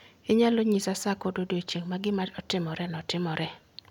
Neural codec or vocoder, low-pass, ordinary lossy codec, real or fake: none; 19.8 kHz; none; real